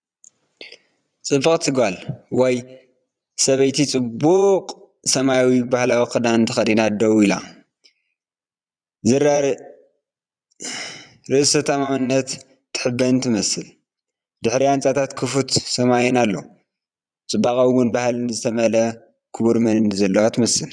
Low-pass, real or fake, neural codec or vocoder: 9.9 kHz; fake; vocoder, 22.05 kHz, 80 mel bands, Vocos